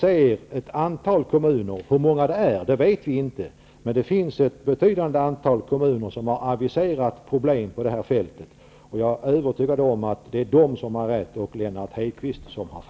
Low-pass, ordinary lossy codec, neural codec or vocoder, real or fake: none; none; none; real